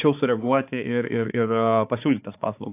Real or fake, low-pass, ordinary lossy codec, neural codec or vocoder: fake; 3.6 kHz; AAC, 32 kbps; codec, 16 kHz, 4 kbps, X-Codec, HuBERT features, trained on balanced general audio